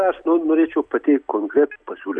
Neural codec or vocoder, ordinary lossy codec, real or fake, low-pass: none; AAC, 64 kbps; real; 9.9 kHz